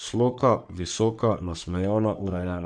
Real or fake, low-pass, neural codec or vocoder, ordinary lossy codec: fake; 9.9 kHz; codec, 44.1 kHz, 3.4 kbps, Pupu-Codec; none